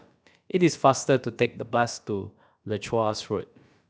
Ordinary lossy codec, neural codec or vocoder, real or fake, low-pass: none; codec, 16 kHz, about 1 kbps, DyCAST, with the encoder's durations; fake; none